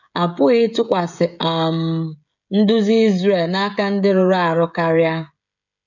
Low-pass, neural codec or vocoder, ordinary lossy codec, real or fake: 7.2 kHz; codec, 16 kHz, 16 kbps, FreqCodec, smaller model; none; fake